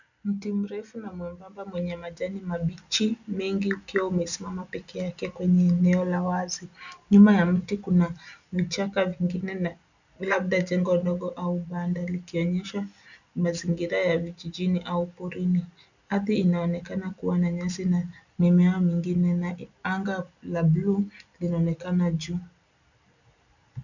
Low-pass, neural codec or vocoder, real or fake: 7.2 kHz; none; real